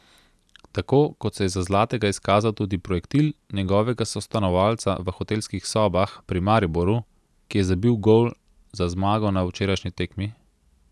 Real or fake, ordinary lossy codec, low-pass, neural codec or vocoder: real; none; none; none